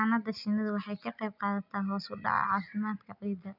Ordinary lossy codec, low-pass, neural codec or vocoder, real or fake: none; 5.4 kHz; none; real